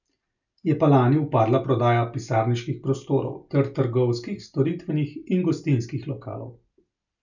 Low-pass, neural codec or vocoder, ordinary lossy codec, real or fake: 7.2 kHz; none; none; real